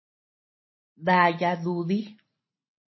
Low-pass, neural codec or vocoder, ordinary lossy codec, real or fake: 7.2 kHz; none; MP3, 24 kbps; real